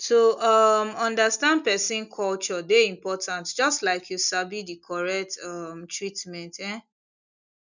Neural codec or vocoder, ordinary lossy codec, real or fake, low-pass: none; none; real; 7.2 kHz